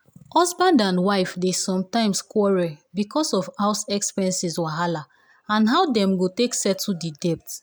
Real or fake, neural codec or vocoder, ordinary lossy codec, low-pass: real; none; none; none